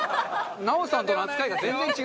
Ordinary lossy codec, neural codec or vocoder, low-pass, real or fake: none; none; none; real